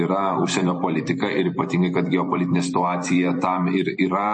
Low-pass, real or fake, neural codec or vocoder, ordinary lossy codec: 10.8 kHz; fake; vocoder, 44.1 kHz, 128 mel bands every 256 samples, BigVGAN v2; MP3, 32 kbps